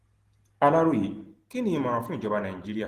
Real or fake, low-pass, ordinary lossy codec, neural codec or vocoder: real; 14.4 kHz; Opus, 16 kbps; none